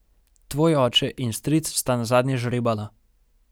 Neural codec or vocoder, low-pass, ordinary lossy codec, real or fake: none; none; none; real